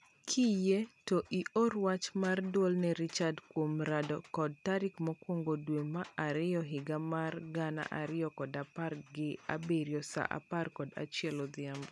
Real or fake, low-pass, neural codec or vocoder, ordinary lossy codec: real; none; none; none